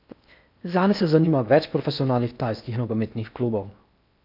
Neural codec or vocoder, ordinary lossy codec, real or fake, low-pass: codec, 16 kHz in and 24 kHz out, 0.6 kbps, FocalCodec, streaming, 4096 codes; none; fake; 5.4 kHz